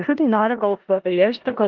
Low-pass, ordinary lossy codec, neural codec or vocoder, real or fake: 7.2 kHz; Opus, 24 kbps; codec, 16 kHz in and 24 kHz out, 0.4 kbps, LongCat-Audio-Codec, four codebook decoder; fake